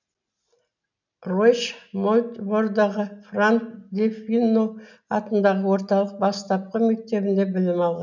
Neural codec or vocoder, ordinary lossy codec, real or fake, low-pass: none; none; real; 7.2 kHz